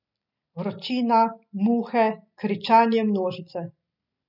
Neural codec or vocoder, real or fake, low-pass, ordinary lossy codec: none; real; 5.4 kHz; none